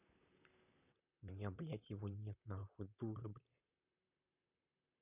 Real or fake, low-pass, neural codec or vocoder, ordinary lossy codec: fake; 3.6 kHz; vocoder, 44.1 kHz, 128 mel bands, Pupu-Vocoder; none